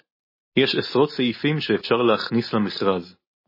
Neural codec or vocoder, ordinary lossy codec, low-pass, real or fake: none; MP3, 24 kbps; 5.4 kHz; real